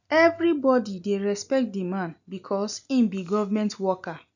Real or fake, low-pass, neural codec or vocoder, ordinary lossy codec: real; 7.2 kHz; none; none